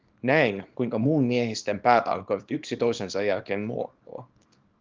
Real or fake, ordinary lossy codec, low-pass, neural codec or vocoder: fake; Opus, 32 kbps; 7.2 kHz; codec, 24 kHz, 0.9 kbps, WavTokenizer, small release